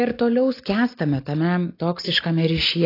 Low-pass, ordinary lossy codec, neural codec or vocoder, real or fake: 5.4 kHz; AAC, 32 kbps; none; real